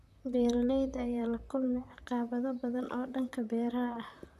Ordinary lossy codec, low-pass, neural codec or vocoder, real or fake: none; 14.4 kHz; vocoder, 44.1 kHz, 128 mel bands, Pupu-Vocoder; fake